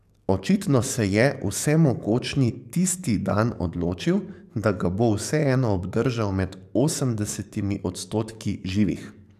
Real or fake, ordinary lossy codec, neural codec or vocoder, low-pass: fake; none; codec, 44.1 kHz, 7.8 kbps, DAC; 14.4 kHz